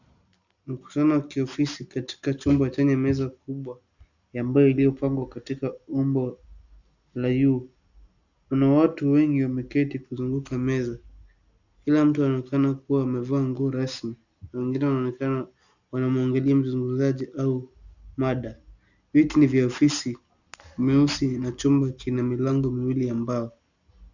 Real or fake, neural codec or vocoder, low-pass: real; none; 7.2 kHz